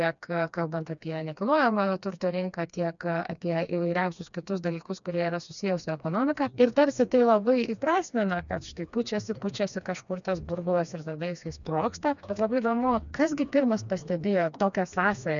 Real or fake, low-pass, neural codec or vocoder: fake; 7.2 kHz; codec, 16 kHz, 2 kbps, FreqCodec, smaller model